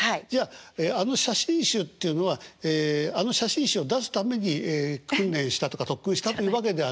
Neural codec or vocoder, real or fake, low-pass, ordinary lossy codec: none; real; none; none